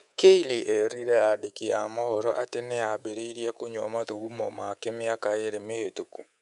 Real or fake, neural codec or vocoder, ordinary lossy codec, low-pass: fake; codec, 24 kHz, 3.1 kbps, DualCodec; none; 10.8 kHz